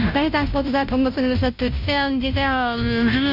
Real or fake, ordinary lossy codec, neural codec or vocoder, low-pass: fake; AAC, 48 kbps; codec, 16 kHz, 0.5 kbps, FunCodec, trained on Chinese and English, 25 frames a second; 5.4 kHz